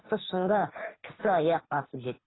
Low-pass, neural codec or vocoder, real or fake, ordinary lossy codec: 7.2 kHz; codec, 24 kHz, 3 kbps, HILCodec; fake; AAC, 16 kbps